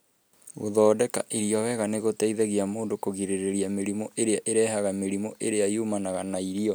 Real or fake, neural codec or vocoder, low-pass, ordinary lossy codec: real; none; none; none